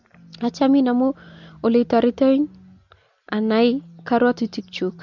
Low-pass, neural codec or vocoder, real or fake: 7.2 kHz; none; real